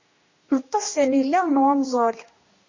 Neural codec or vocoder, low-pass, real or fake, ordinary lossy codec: codec, 16 kHz, 1 kbps, X-Codec, HuBERT features, trained on general audio; 7.2 kHz; fake; MP3, 32 kbps